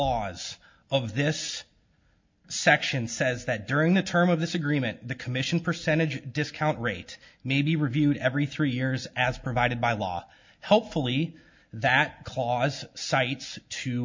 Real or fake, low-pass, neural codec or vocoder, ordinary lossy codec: real; 7.2 kHz; none; MP3, 48 kbps